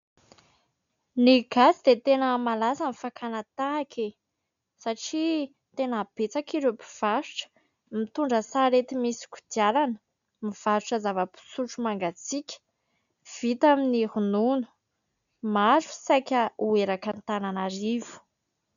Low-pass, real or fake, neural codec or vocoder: 7.2 kHz; real; none